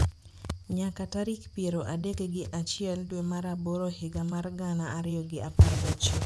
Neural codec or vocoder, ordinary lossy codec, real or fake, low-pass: vocoder, 24 kHz, 100 mel bands, Vocos; none; fake; none